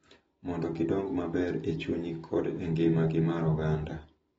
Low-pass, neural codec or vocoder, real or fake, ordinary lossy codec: 19.8 kHz; none; real; AAC, 24 kbps